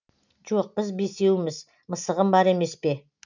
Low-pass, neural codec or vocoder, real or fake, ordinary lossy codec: 7.2 kHz; none; real; none